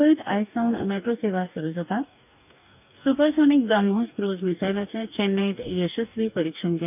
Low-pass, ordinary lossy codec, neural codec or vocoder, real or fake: 3.6 kHz; none; codec, 44.1 kHz, 2.6 kbps, DAC; fake